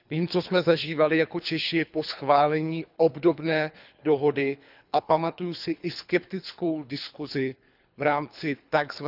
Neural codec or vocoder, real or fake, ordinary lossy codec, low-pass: codec, 24 kHz, 3 kbps, HILCodec; fake; none; 5.4 kHz